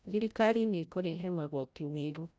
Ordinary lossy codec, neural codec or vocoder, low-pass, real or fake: none; codec, 16 kHz, 0.5 kbps, FreqCodec, larger model; none; fake